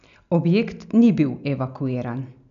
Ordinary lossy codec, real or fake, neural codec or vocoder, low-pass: none; real; none; 7.2 kHz